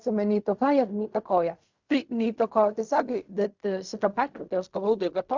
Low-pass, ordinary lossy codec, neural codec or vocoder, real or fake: 7.2 kHz; Opus, 64 kbps; codec, 16 kHz in and 24 kHz out, 0.4 kbps, LongCat-Audio-Codec, fine tuned four codebook decoder; fake